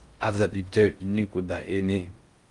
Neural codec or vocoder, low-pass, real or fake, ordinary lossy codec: codec, 16 kHz in and 24 kHz out, 0.6 kbps, FocalCodec, streaming, 4096 codes; 10.8 kHz; fake; Opus, 32 kbps